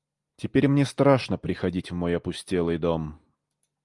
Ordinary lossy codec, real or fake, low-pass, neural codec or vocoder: Opus, 32 kbps; real; 10.8 kHz; none